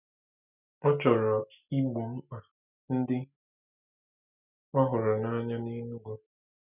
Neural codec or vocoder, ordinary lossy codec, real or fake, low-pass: none; none; real; 3.6 kHz